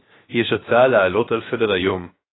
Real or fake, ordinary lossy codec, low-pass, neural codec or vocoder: fake; AAC, 16 kbps; 7.2 kHz; codec, 16 kHz, 0.7 kbps, FocalCodec